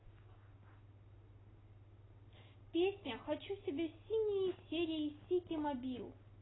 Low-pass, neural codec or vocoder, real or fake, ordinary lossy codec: 7.2 kHz; none; real; AAC, 16 kbps